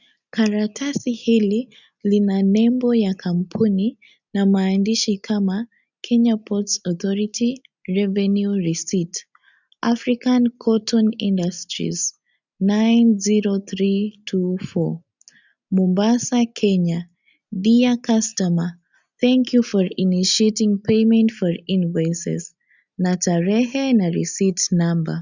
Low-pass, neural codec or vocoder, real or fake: 7.2 kHz; none; real